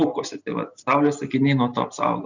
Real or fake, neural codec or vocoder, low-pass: real; none; 7.2 kHz